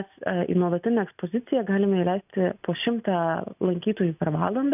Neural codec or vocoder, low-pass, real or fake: none; 3.6 kHz; real